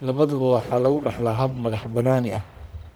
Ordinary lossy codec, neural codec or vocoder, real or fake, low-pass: none; codec, 44.1 kHz, 1.7 kbps, Pupu-Codec; fake; none